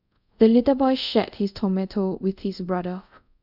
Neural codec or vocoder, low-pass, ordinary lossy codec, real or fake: codec, 24 kHz, 0.5 kbps, DualCodec; 5.4 kHz; none; fake